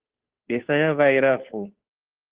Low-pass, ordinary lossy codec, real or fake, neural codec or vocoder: 3.6 kHz; Opus, 16 kbps; fake; codec, 16 kHz, 2 kbps, FunCodec, trained on Chinese and English, 25 frames a second